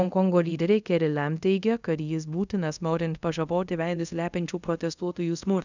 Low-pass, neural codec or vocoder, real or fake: 7.2 kHz; codec, 24 kHz, 0.5 kbps, DualCodec; fake